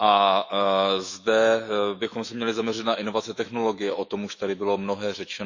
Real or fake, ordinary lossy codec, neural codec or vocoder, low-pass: fake; Opus, 64 kbps; autoencoder, 48 kHz, 128 numbers a frame, DAC-VAE, trained on Japanese speech; 7.2 kHz